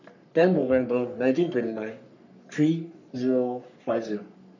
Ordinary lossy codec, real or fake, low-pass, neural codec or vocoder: none; fake; 7.2 kHz; codec, 44.1 kHz, 3.4 kbps, Pupu-Codec